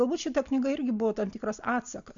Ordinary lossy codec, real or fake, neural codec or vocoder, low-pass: AAC, 64 kbps; real; none; 7.2 kHz